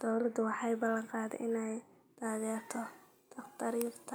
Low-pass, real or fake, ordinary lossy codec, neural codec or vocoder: none; real; none; none